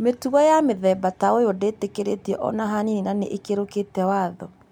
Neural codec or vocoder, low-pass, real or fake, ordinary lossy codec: none; 19.8 kHz; real; MP3, 96 kbps